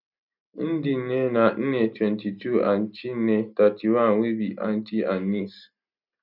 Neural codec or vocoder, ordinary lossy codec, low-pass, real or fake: none; none; 5.4 kHz; real